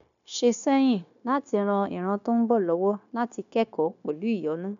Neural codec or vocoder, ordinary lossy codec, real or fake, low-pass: codec, 16 kHz, 0.9 kbps, LongCat-Audio-Codec; none; fake; 7.2 kHz